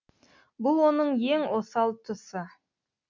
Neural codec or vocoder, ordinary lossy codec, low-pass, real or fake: none; MP3, 64 kbps; 7.2 kHz; real